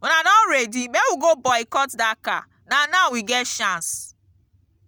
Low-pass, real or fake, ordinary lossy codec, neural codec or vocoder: none; real; none; none